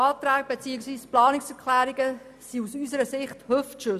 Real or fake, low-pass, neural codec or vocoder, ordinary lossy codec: real; 14.4 kHz; none; none